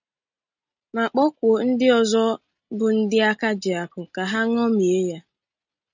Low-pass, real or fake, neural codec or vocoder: 7.2 kHz; real; none